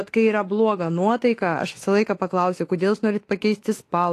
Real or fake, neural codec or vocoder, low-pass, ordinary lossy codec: fake; autoencoder, 48 kHz, 32 numbers a frame, DAC-VAE, trained on Japanese speech; 14.4 kHz; AAC, 48 kbps